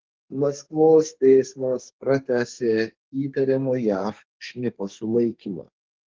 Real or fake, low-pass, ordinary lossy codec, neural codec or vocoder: fake; 7.2 kHz; Opus, 16 kbps; codec, 32 kHz, 1.9 kbps, SNAC